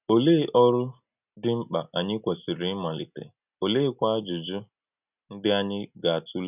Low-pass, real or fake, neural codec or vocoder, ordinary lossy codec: 3.6 kHz; real; none; none